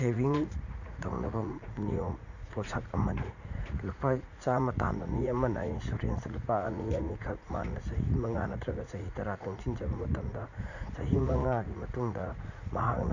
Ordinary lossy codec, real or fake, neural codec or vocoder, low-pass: none; fake; vocoder, 44.1 kHz, 80 mel bands, Vocos; 7.2 kHz